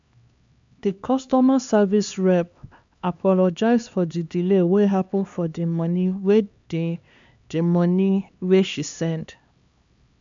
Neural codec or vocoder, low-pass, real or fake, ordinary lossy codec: codec, 16 kHz, 2 kbps, X-Codec, HuBERT features, trained on LibriSpeech; 7.2 kHz; fake; MP3, 64 kbps